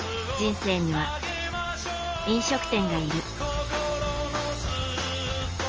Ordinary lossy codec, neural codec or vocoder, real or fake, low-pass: Opus, 24 kbps; none; real; 7.2 kHz